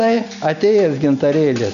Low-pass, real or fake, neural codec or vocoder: 7.2 kHz; real; none